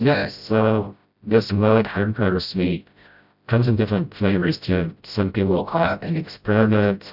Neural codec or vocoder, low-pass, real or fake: codec, 16 kHz, 0.5 kbps, FreqCodec, smaller model; 5.4 kHz; fake